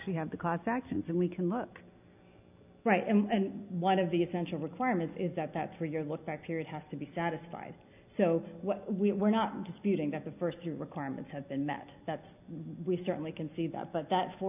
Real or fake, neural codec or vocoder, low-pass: real; none; 3.6 kHz